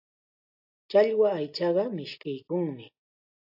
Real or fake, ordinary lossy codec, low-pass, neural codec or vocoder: real; AAC, 48 kbps; 5.4 kHz; none